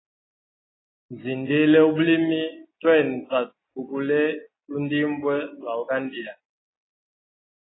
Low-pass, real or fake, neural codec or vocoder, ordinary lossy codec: 7.2 kHz; real; none; AAC, 16 kbps